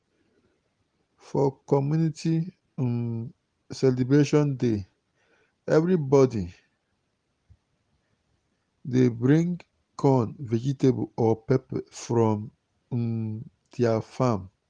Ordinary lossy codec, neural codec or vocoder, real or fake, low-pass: Opus, 24 kbps; none; real; 9.9 kHz